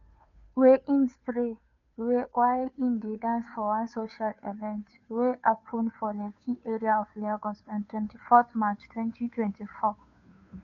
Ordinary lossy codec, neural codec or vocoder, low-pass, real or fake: none; codec, 16 kHz, 2 kbps, FunCodec, trained on Chinese and English, 25 frames a second; 7.2 kHz; fake